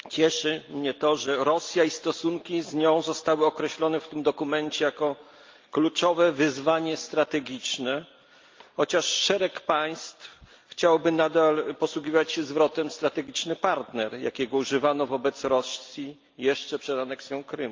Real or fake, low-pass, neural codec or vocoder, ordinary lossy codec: real; 7.2 kHz; none; Opus, 24 kbps